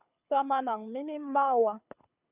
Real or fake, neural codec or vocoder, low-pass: fake; codec, 24 kHz, 6 kbps, HILCodec; 3.6 kHz